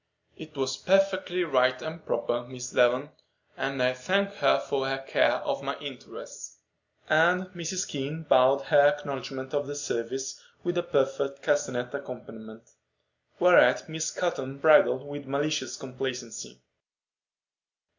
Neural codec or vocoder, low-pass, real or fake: none; 7.2 kHz; real